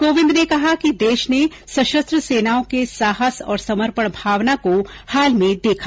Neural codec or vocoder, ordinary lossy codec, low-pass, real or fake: none; none; none; real